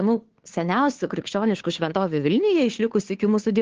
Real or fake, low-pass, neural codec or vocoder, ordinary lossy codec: fake; 7.2 kHz; codec, 16 kHz, 2 kbps, FunCodec, trained on Chinese and English, 25 frames a second; Opus, 16 kbps